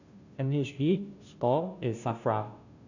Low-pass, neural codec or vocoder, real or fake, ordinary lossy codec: 7.2 kHz; codec, 16 kHz, 0.5 kbps, FunCodec, trained on Chinese and English, 25 frames a second; fake; none